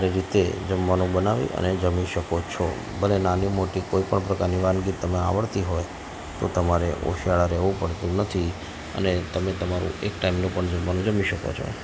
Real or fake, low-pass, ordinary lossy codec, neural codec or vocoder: real; none; none; none